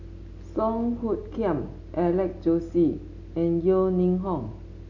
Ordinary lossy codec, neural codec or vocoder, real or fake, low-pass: none; none; real; 7.2 kHz